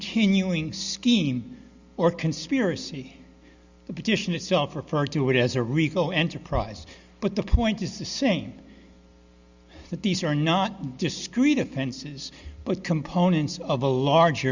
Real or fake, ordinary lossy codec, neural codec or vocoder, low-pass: real; Opus, 64 kbps; none; 7.2 kHz